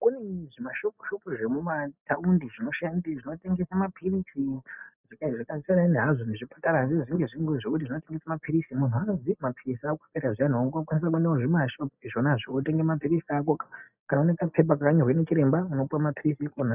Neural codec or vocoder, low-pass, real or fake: none; 3.6 kHz; real